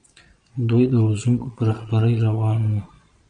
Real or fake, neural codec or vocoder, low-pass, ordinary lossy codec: fake; vocoder, 22.05 kHz, 80 mel bands, Vocos; 9.9 kHz; MP3, 96 kbps